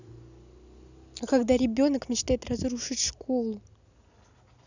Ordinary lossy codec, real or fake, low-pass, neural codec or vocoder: none; real; 7.2 kHz; none